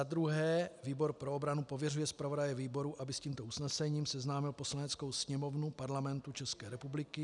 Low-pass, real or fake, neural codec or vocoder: 10.8 kHz; real; none